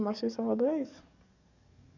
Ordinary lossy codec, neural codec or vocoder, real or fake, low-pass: none; codec, 44.1 kHz, 7.8 kbps, DAC; fake; 7.2 kHz